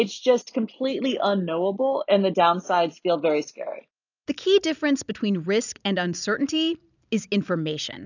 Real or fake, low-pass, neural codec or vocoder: real; 7.2 kHz; none